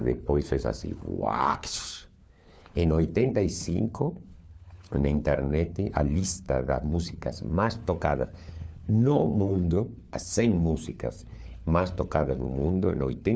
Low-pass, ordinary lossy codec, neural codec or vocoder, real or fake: none; none; codec, 16 kHz, 4 kbps, FunCodec, trained on LibriTTS, 50 frames a second; fake